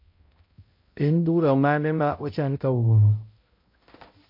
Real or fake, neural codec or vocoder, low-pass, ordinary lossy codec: fake; codec, 16 kHz, 0.5 kbps, X-Codec, HuBERT features, trained on balanced general audio; 5.4 kHz; MP3, 32 kbps